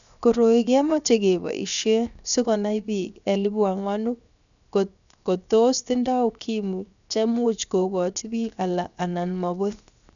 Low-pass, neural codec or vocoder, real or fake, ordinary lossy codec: 7.2 kHz; codec, 16 kHz, 0.7 kbps, FocalCodec; fake; none